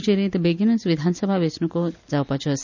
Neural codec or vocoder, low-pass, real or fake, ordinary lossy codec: none; 7.2 kHz; real; none